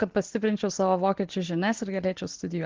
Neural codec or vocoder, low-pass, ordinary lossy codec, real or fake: none; 7.2 kHz; Opus, 16 kbps; real